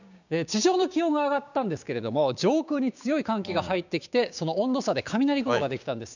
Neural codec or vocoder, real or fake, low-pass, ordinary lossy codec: codec, 16 kHz, 6 kbps, DAC; fake; 7.2 kHz; none